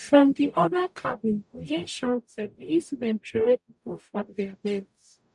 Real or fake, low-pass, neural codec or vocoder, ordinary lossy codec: fake; 10.8 kHz; codec, 44.1 kHz, 0.9 kbps, DAC; MP3, 96 kbps